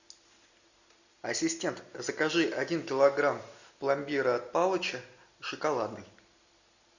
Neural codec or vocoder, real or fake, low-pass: none; real; 7.2 kHz